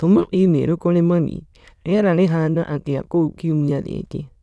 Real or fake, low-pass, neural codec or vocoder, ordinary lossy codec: fake; none; autoencoder, 22.05 kHz, a latent of 192 numbers a frame, VITS, trained on many speakers; none